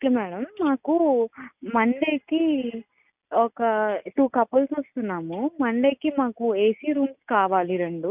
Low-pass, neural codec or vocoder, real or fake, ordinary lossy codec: 3.6 kHz; none; real; none